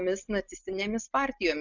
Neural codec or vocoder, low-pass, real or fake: none; 7.2 kHz; real